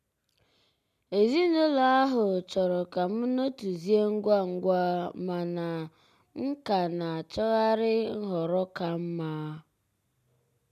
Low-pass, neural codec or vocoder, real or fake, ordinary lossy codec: 14.4 kHz; none; real; none